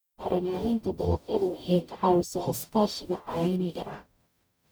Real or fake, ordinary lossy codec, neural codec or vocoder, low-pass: fake; none; codec, 44.1 kHz, 0.9 kbps, DAC; none